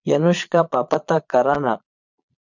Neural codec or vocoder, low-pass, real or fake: vocoder, 22.05 kHz, 80 mel bands, Vocos; 7.2 kHz; fake